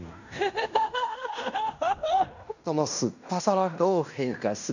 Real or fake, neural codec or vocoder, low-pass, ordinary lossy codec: fake; codec, 16 kHz in and 24 kHz out, 0.9 kbps, LongCat-Audio-Codec, fine tuned four codebook decoder; 7.2 kHz; none